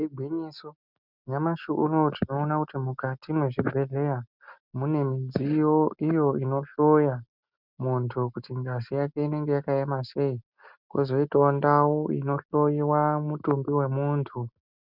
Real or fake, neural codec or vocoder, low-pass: real; none; 5.4 kHz